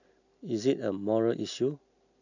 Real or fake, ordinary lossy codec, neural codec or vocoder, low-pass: real; none; none; 7.2 kHz